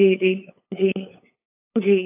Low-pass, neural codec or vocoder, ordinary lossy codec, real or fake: 3.6 kHz; codec, 16 kHz, 8 kbps, FunCodec, trained on LibriTTS, 25 frames a second; AAC, 24 kbps; fake